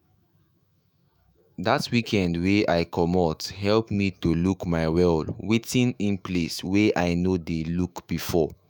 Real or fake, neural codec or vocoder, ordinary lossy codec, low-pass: fake; autoencoder, 48 kHz, 128 numbers a frame, DAC-VAE, trained on Japanese speech; none; none